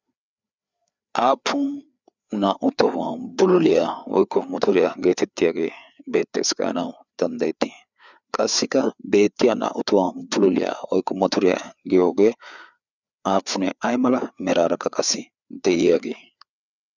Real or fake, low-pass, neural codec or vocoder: fake; 7.2 kHz; codec, 16 kHz, 4 kbps, FreqCodec, larger model